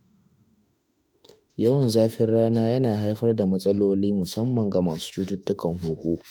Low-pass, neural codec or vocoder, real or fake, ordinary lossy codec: none; autoencoder, 48 kHz, 32 numbers a frame, DAC-VAE, trained on Japanese speech; fake; none